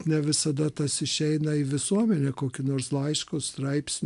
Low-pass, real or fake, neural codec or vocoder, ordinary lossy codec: 10.8 kHz; real; none; AAC, 96 kbps